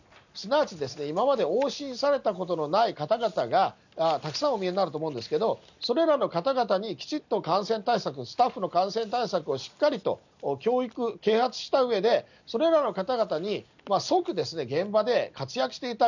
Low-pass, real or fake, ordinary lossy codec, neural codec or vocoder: 7.2 kHz; real; none; none